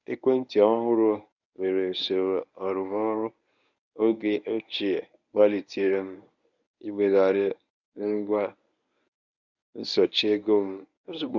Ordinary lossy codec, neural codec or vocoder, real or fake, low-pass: none; codec, 24 kHz, 0.9 kbps, WavTokenizer, medium speech release version 1; fake; 7.2 kHz